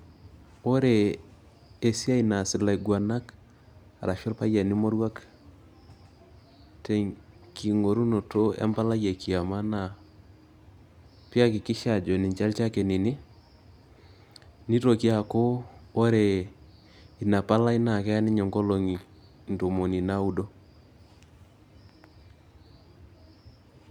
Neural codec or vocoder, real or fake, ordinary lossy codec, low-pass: none; real; none; 19.8 kHz